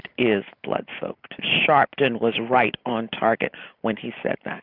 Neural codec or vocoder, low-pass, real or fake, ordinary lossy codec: none; 5.4 kHz; real; Opus, 64 kbps